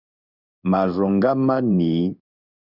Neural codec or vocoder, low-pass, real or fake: codec, 16 kHz in and 24 kHz out, 1 kbps, XY-Tokenizer; 5.4 kHz; fake